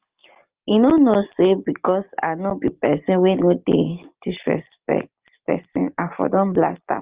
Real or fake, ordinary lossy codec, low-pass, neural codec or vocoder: real; Opus, 24 kbps; 3.6 kHz; none